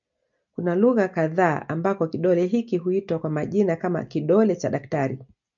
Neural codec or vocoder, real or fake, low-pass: none; real; 7.2 kHz